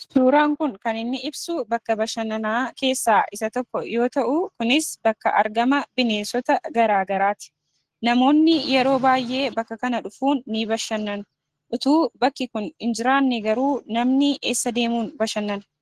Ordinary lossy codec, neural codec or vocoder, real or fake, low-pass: Opus, 16 kbps; none; real; 14.4 kHz